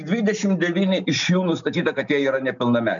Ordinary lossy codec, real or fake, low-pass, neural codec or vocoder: MP3, 96 kbps; real; 7.2 kHz; none